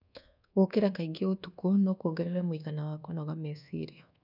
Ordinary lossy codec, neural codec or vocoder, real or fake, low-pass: none; codec, 24 kHz, 1.2 kbps, DualCodec; fake; 5.4 kHz